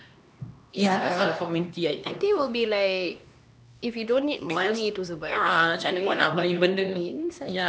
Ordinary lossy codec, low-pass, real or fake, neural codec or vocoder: none; none; fake; codec, 16 kHz, 2 kbps, X-Codec, HuBERT features, trained on LibriSpeech